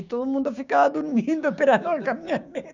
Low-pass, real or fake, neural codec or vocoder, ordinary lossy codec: 7.2 kHz; fake; codec, 16 kHz, 6 kbps, DAC; none